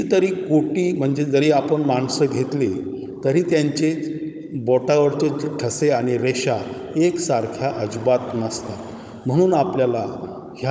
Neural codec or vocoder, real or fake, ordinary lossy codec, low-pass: codec, 16 kHz, 16 kbps, FunCodec, trained on Chinese and English, 50 frames a second; fake; none; none